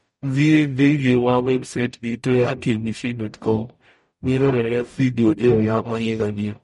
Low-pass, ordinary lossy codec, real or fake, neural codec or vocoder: 19.8 kHz; MP3, 48 kbps; fake; codec, 44.1 kHz, 0.9 kbps, DAC